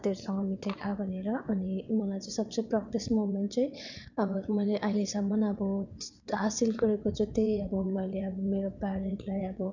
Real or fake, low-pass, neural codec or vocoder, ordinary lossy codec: fake; 7.2 kHz; vocoder, 22.05 kHz, 80 mel bands, WaveNeXt; none